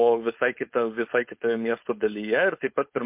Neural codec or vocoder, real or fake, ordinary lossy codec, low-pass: codec, 16 kHz, 4.8 kbps, FACodec; fake; MP3, 32 kbps; 3.6 kHz